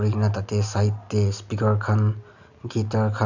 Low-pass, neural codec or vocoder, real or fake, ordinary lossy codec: 7.2 kHz; none; real; none